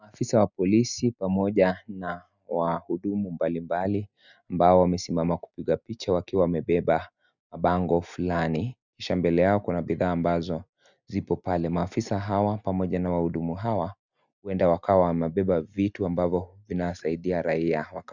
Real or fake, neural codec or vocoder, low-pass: real; none; 7.2 kHz